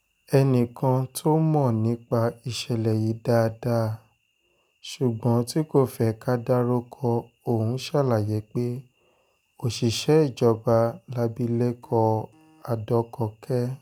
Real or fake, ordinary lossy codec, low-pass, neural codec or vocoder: real; none; none; none